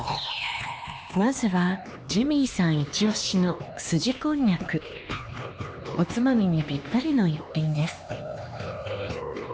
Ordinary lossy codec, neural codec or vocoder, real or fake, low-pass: none; codec, 16 kHz, 2 kbps, X-Codec, HuBERT features, trained on LibriSpeech; fake; none